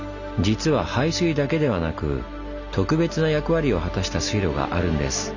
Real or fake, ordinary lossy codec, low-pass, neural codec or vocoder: real; none; 7.2 kHz; none